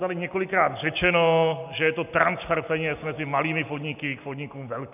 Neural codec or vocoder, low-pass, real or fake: none; 3.6 kHz; real